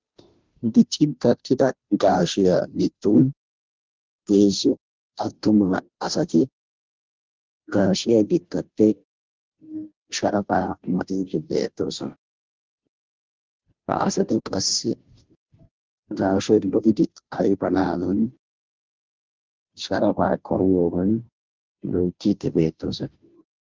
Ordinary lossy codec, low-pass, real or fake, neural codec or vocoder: Opus, 32 kbps; 7.2 kHz; fake; codec, 16 kHz, 0.5 kbps, FunCodec, trained on Chinese and English, 25 frames a second